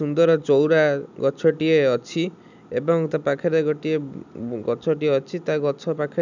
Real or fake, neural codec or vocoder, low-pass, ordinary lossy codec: real; none; 7.2 kHz; none